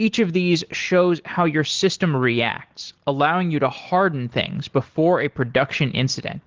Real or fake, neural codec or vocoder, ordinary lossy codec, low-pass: real; none; Opus, 16 kbps; 7.2 kHz